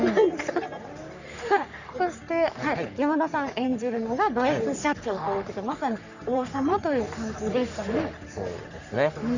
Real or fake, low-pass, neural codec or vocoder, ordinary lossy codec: fake; 7.2 kHz; codec, 44.1 kHz, 3.4 kbps, Pupu-Codec; none